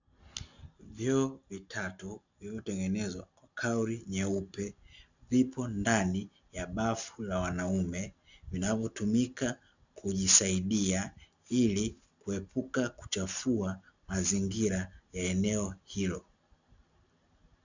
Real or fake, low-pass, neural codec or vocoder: real; 7.2 kHz; none